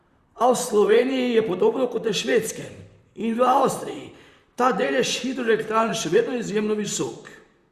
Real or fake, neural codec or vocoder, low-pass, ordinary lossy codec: fake; vocoder, 44.1 kHz, 128 mel bands, Pupu-Vocoder; 14.4 kHz; Opus, 64 kbps